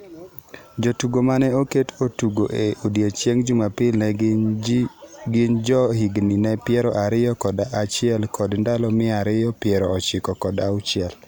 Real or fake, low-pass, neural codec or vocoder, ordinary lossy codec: real; none; none; none